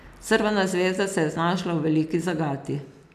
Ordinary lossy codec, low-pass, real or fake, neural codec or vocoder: none; 14.4 kHz; real; none